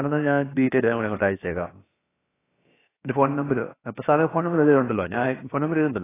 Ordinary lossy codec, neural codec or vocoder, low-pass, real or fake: AAC, 16 kbps; codec, 16 kHz, about 1 kbps, DyCAST, with the encoder's durations; 3.6 kHz; fake